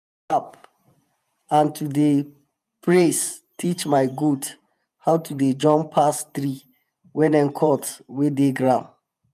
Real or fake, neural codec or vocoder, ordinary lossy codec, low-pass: fake; vocoder, 48 kHz, 128 mel bands, Vocos; none; 14.4 kHz